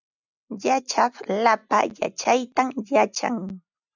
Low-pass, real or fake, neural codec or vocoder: 7.2 kHz; real; none